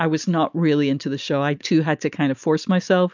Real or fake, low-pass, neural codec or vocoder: real; 7.2 kHz; none